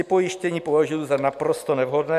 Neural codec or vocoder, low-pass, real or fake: none; 14.4 kHz; real